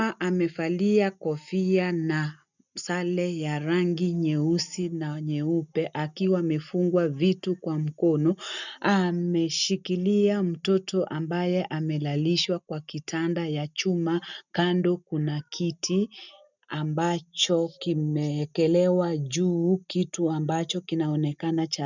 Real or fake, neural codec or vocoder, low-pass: real; none; 7.2 kHz